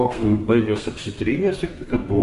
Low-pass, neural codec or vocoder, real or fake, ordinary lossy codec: 14.4 kHz; codec, 32 kHz, 1.9 kbps, SNAC; fake; MP3, 48 kbps